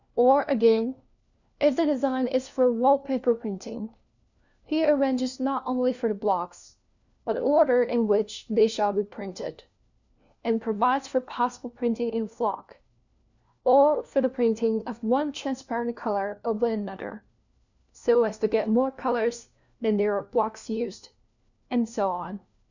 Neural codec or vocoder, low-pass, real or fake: codec, 16 kHz, 1 kbps, FunCodec, trained on LibriTTS, 50 frames a second; 7.2 kHz; fake